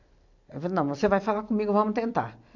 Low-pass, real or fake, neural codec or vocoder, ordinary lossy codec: 7.2 kHz; real; none; AAC, 48 kbps